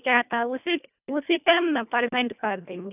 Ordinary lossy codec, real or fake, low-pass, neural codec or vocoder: none; fake; 3.6 kHz; codec, 24 kHz, 1.5 kbps, HILCodec